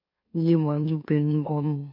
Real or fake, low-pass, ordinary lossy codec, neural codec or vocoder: fake; 5.4 kHz; MP3, 32 kbps; autoencoder, 44.1 kHz, a latent of 192 numbers a frame, MeloTTS